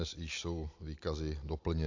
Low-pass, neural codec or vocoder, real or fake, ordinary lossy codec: 7.2 kHz; none; real; AAC, 48 kbps